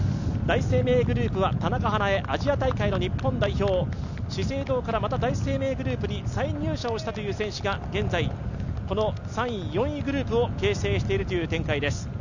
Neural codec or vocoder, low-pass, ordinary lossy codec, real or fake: none; 7.2 kHz; none; real